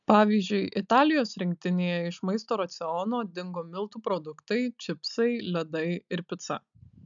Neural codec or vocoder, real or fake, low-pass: none; real; 7.2 kHz